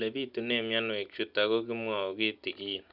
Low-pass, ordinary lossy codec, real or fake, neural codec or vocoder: 5.4 kHz; none; real; none